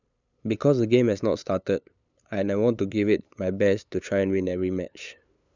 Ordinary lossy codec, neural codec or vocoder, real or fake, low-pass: none; codec, 16 kHz, 8 kbps, FunCodec, trained on LibriTTS, 25 frames a second; fake; 7.2 kHz